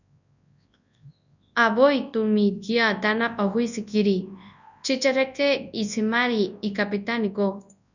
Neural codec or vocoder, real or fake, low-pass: codec, 24 kHz, 0.9 kbps, WavTokenizer, large speech release; fake; 7.2 kHz